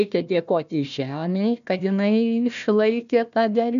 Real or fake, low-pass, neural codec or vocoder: fake; 7.2 kHz; codec, 16 kHz, 1 kbps, FunCodec, trained on Chinese and English, 50 frames a second